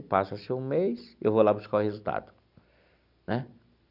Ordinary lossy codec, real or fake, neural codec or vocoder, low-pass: none; real; none; 5.4 kHz